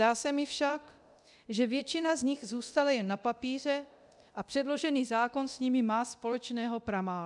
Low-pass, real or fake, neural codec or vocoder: 10.8 kHz; fake; codec, 24 kHz, 0.9 kbps, DualCodec